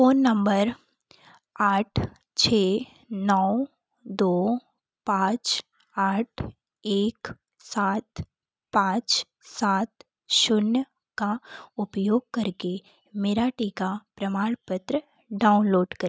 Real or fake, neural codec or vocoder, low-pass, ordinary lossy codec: real; none; none; none